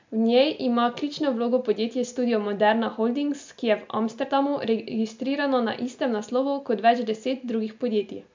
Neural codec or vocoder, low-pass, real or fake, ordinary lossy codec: none; 7.2 kHz; real; MP3, 64 kbps